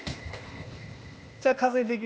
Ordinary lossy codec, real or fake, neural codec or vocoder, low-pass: none; fake; codec, 16 kHz, 0.8 kbps, ZipCodec; none